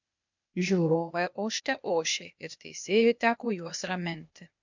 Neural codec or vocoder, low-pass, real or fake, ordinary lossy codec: codec, 16 kHz, 0.8 kbps, ZipCodec; 7.2 kHz; fake; MP3, 64 kbps